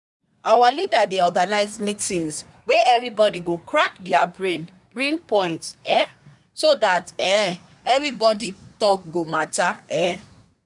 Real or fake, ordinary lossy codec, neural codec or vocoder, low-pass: fake; none; codec, 24 kHz, 1 kbps, SNAC; 10.8 kHz